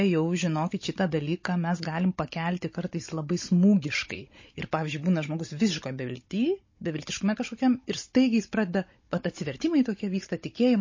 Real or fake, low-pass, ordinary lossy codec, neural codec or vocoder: fake; 7.2 kHz; MP3, 32 kbps; codec, 16 kHz, 16 kbps, FunCodec, trained on Chinese and English, 50 frames a second